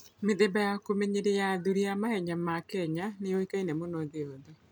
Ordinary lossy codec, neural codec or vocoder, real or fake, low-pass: none; none; real; none